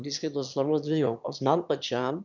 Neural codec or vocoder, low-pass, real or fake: autoencoder, 22.05 kHz, a latent of 192 numbers a frame, VITS, trained on one speaker; 7.2 kHz; fake